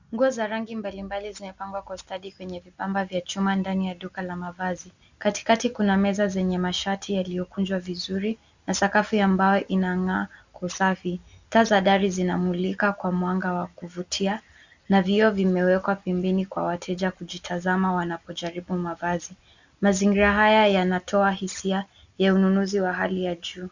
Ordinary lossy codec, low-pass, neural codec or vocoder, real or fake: Opus, 64 kbps; 7.2 kHz; none; real